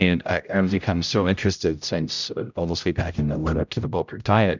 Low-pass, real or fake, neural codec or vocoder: 7.2 kHz; fake; codec, 16 kHz, 0.5 kbps, X-Codec, HuBERT features, trained on general audio